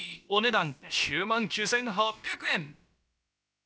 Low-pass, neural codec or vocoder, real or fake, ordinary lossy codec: none; codec, 16 kHz, about 1 kbps, DyCAST, with the encoder's durations; fake; none